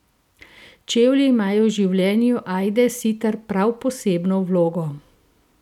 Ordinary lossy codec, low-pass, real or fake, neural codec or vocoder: none; 19.8 kHz; real; none